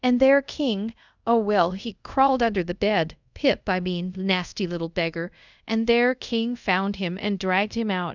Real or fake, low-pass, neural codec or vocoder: fake; 7.2 kHz; codec, 24 kHz, 0.5 kbps, DualCodec